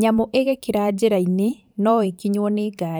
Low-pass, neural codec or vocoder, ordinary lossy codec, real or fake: none; vocoder, 44.1 kHz, 128 mel bands every 512 samples, BigVGAN v2; none; fake